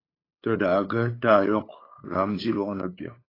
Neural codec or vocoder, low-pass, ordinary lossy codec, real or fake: codec, 16 kHz, 8 kbps, FunCodec, trained on LibriTTS, 25 frames a second; 5.4 kHz; AAC, 24 kbps; fake